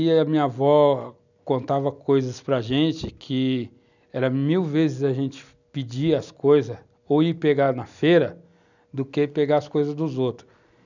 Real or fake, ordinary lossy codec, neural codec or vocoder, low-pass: real; none; none; 7.2 kHz